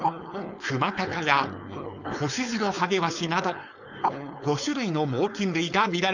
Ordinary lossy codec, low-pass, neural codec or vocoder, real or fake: none; 7.2 kHz; codec, 16 kHz, 4.8 kbps, FACodec; fake